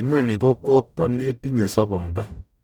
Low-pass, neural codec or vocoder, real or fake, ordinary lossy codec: 19.8 kHz; codec, 44.1 kHz, 0.9 kbps, DAC; fake; none